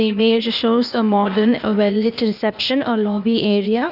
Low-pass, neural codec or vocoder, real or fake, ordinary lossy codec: 5.4 kHz; codec, 16 kHz, 0.8 kbps, ZipCodec; fake; none